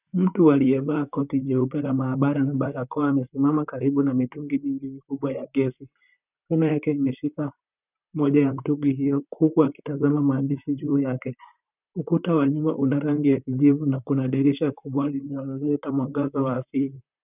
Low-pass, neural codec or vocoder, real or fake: 3.6 kHz; vocoder, 44.1 kHz, 128 mel bands, Pupu-Vocoder; fake